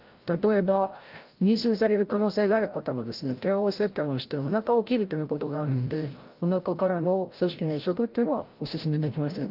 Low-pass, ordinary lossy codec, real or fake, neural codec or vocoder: 5.4 kHz; Opus, 32 kbps; fake; codec, 16 kHz, 0.5 kbps, FreqCodec, larger model